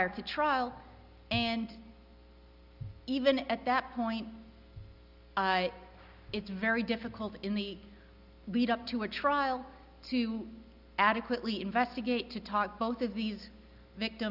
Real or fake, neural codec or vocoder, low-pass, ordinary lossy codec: real; none; 5.4 kHz; Opus, 64 kbps